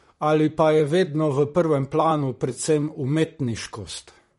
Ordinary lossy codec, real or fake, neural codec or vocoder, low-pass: MP3, 48 kbps; fake; vocoder, 44.1 kHz, 128 mel bands, Pupu-Vocoder; 19.8 kHz